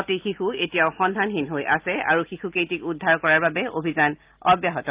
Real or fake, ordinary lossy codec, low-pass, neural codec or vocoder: real; Opus, 32 kbps; 3.6 kHz; none